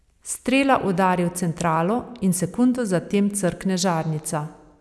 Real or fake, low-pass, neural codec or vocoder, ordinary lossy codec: real; none; none; none